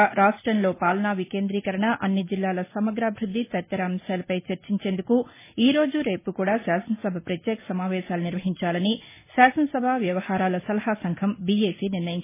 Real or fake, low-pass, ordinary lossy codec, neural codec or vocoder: real; 3.6 kHz; MP3, 16 kbps; none